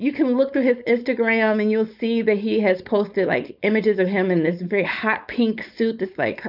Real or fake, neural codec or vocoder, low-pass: fake; codec, 16 kHz, 4.8 kbps, FACodec; 5.4 kHz